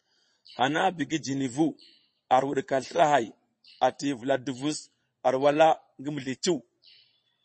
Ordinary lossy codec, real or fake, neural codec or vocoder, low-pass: MP3, 32 kbps; fake; vocoder, 22.05 kHz, 80 mel bands, Vocos; 9.9 kHz